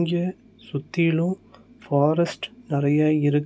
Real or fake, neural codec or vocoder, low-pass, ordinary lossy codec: real; none; none; none